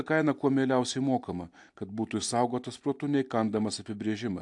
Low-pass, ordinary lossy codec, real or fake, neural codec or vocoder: 10.8 kHz; AAC, 64 kbps; real; none